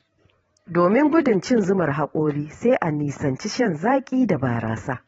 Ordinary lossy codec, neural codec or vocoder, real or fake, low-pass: AAC, 24 kbps; vocoder, 44.1 kHz, 128 mel bands every 512 samples, BigVGAN v2; fake; 19.8 kHz